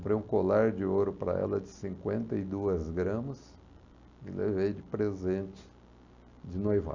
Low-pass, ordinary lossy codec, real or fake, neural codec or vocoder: 7.2 kHz; Opus, 64 kbps; real; none